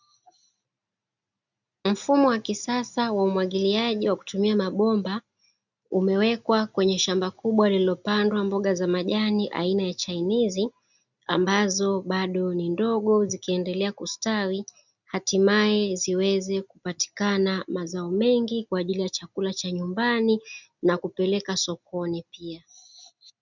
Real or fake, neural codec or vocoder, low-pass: real; none; 7.2 kHz